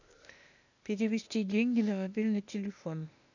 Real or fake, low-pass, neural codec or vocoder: fake; 7.2 kHz; codec, 16 kHz, 0.8 kbps, ZipCodec